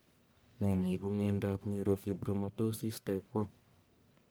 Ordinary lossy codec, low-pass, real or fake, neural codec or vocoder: none; none; fake; codec, 44.1 kHz, 1.7 kbps, Pupu-Codec